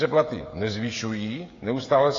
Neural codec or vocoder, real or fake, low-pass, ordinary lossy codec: codec, 16 kHz, 16 kbps, FreqCodec, smaller model; fake; 7.2 kHz; AAC, 32 kbps